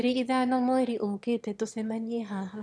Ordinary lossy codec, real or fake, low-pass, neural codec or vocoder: none; fake; none; autoencoder, 22.05 kHz, a latent of 192 numbers a frame, VITS, trained on one speaker